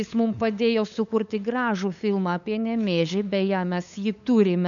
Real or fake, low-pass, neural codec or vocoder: fake; 7.2 kHz; codec, 16 kHz, 8 kbps, FunCodec, trained on LibriTTS, 25 frames a second